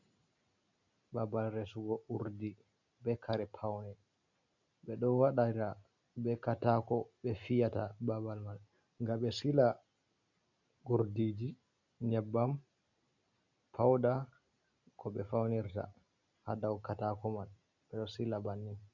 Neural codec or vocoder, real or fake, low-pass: none; real; 7.2 kHz